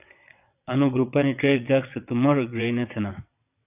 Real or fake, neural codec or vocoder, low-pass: fake; vocoder, 22.05 kHz, 80 mel bands, WaveNeXt; 3.6 kHz